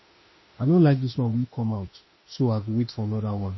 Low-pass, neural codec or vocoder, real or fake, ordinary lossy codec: 7.2 kHz; autoencoder, 48 kHz, 32 numbers a frame, DAC-VAE, trained on Japanese speech; fake; MP3, 24 kbps